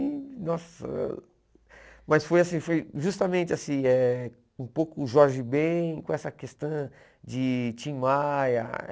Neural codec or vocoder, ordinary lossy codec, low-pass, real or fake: none; none; none; real